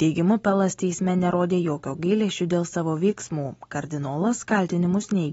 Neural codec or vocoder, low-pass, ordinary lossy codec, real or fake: none; 19.8 kHz; AAC, 24 kbps; real